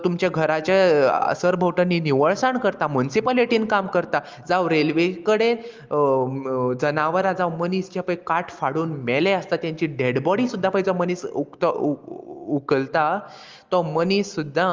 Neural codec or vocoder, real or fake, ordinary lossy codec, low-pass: none; real; Opus, 32 kbps; 7.2 kHz